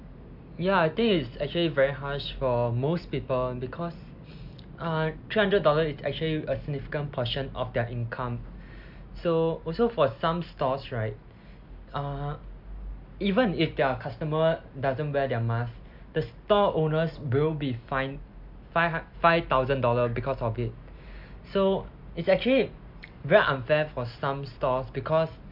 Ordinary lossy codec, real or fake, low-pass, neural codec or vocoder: MP3, 48 kbps; real; 5.4 kHz; none